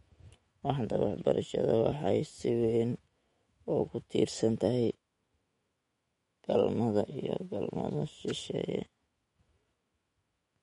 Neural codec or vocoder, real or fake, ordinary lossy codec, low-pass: autoencoder, 48 kHz, 128 numbers a frame, DAC-VAE, trained on Japanese speech; fake; MP3, 48 kbps; 19.8 kHz